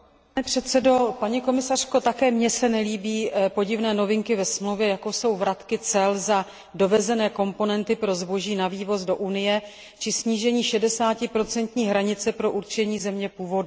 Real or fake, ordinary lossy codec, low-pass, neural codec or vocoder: real; none; none; none